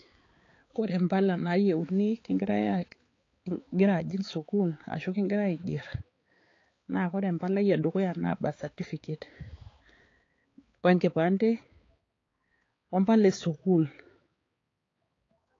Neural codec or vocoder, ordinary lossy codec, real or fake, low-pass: codec, 16 kHz, 4 kbps, X-Codec, HuBERT features, trained on balanced general audio; AAC, 32 kbps; fake; 7.2 kHz